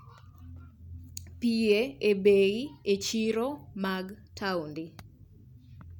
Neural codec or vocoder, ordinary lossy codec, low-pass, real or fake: none; none; 19.8 kHz; real